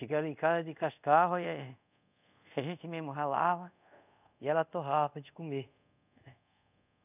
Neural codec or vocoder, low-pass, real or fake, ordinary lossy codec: codec, 24 kHz, 0.5 kbps, DualCodec; 3.6 kHz; fake; none